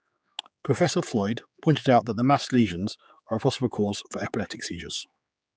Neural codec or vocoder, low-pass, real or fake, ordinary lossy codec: codec, 16 kHz, 4 kbps, X-Codec, HuBERT features, trained on general audio; none; fake; none